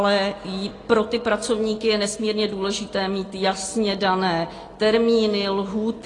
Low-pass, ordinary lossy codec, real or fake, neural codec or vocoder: 10.8 kHz; AAC, 32 kbps; real; none